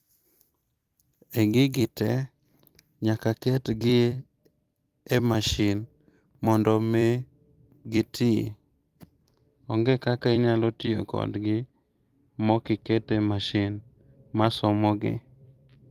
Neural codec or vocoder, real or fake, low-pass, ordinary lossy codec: vocoder, 44.1 kHz, 128 mel bands every 256 samples, BigVGAN v2; fake; 14.4 kHz; Opus, 32 kbps